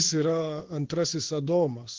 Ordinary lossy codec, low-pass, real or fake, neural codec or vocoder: Opus, 16 kbps; 7.2 kHz; fake; codec, 16 kHz in and 24 kHz out, 1 kbps, XY-Tokenizer